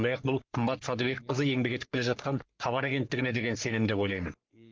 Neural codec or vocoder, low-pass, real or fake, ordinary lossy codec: codec, 44.1 kHz, 3.4 kbps, Pupu-Codec; 7.2 kHz; fake; Opus, 24 kbps